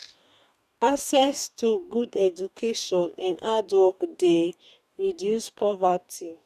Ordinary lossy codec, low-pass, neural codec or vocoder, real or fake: none; 14.4 kHz; codec, 44.1 kHz, 2.6 kbps, DAC; fake